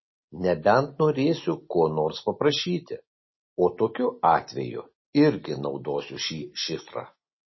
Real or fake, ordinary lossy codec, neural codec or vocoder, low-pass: real; MP3, 24 kbps; none; 7.2 kHz